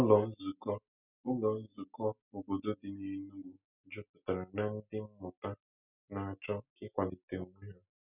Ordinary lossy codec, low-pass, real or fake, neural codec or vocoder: none; 3.6 kHz; real; none